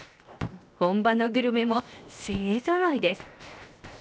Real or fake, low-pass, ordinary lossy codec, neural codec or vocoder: fake; none; none; codec, 16 kHz, 0.7 kbps, FocalCodec